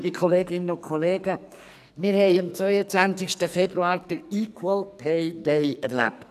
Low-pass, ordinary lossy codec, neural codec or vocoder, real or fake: 14.4 kHz; none; codec, 44.1 kHz, 2.6 kbps, SNAC; fake